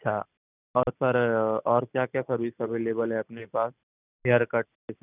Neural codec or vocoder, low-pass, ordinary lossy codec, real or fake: none; 3.6 kHz; none; real